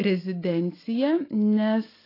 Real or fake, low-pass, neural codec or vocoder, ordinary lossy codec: real; 5.4 kHz; none; AAC, 24 kbps